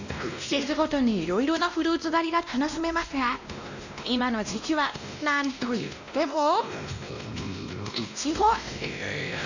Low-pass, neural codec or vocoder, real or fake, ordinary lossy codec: 7.2 kHz; codec, 16 kHz, 1 kbps, X-Codec, WavLM features, trained on Multilingual LibriSpeech; fake; none